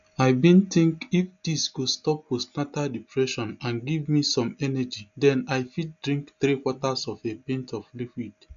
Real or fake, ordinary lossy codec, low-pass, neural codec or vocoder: real; MP3, 96 kbps; 7.2 kHz; none